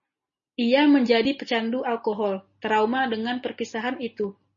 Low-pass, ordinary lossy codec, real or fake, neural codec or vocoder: 7.2 kHz; MP3, 32 kbps; real; none